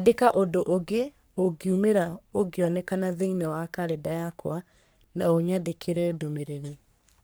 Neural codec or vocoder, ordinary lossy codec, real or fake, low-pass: codec, 44.1 kHz, 3.4 kbps, Pupu-Codec; none; fake; none